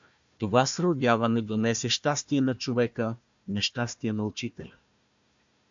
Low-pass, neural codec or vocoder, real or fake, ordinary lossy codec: 7.2 kHz; codec, 16 kHz, 1 kbps, FunCodec, trained on Chinese and English, 50 frames a second; fake; MP3, 64 kbps